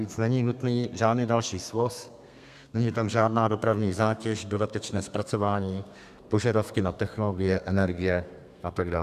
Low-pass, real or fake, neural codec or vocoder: 14.4 kHz; fake; codec, 32 kHz, 1.9 kbps, SNAC